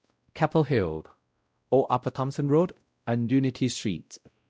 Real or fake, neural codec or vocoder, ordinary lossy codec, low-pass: fake; codec, 16 kHz, 0.5 kbps, X-Codec, WavLM features, trained on Multilingual LibriSpeech; none; none